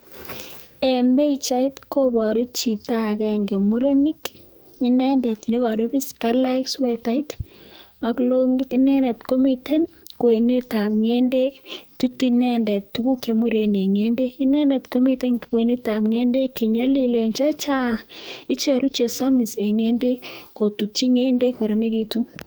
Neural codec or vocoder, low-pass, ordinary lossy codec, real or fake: codec, 44.1 kHz, 2.6 kbps, SNAC; none; none; fake